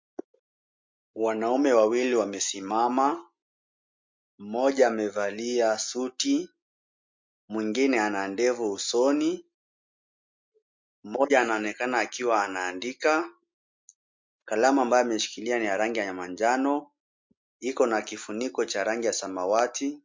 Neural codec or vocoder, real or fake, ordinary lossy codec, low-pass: none; real; MP3, 48 kbps; 7.2 kHz